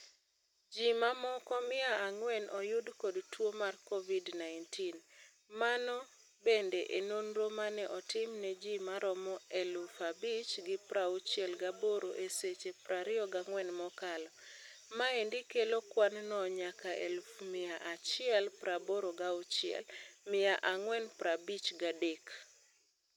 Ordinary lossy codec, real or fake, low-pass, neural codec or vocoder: none; real; 19.8 kHz; none